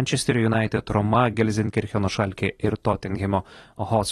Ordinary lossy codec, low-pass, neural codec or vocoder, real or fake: AAC, 32 kbps; 19.8 kHz; vocoder, 44.1 kHz, 128 mel bands every 256 samples, BigVGAN v2; fake